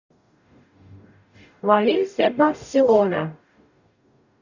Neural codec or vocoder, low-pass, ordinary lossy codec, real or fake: codec, 44.1 kHz, 0.9 kbps, DAC; 7.2 kHz; none; fake